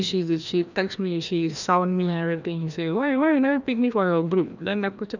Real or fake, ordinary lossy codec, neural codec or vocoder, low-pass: fake; none; codec, 16 kHz, 1 kbps, FreqCodec, larger model; 7.2 kHz